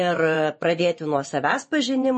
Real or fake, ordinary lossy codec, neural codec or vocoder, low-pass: fake; MP3, 32 kbps; vocoder, 24 kHz, 100 mel bands, Vocos; 10.8 kHz